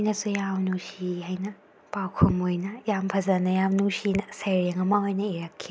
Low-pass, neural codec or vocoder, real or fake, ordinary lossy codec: none; none; real; none